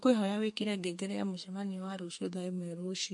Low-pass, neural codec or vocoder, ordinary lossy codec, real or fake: 10.8 kHz; codec, 32 kHz, 1.9 kbps, SNAC; MP3, 48 kbps; fake